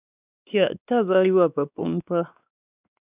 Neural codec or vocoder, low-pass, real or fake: codec, 16 kHz, 2 kbps, X-Codec, WavLM features, trained on Multilingual LibriSpeech; 3.6 kHz; fake